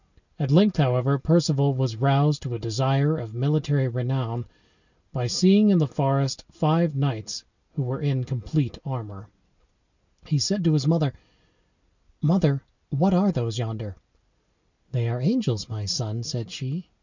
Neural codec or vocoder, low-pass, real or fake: none; 7.2 kHz; real